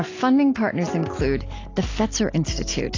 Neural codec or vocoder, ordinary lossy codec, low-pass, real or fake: none; AAC, 32 kbps; 7.2 kHz; real